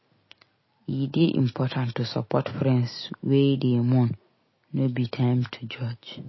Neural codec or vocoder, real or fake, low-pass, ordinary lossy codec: none; real; 7.2 kHz; MP3, 24 kbps